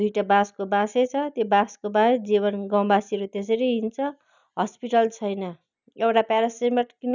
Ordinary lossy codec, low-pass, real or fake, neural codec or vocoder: none; 7.2 kHz; real; none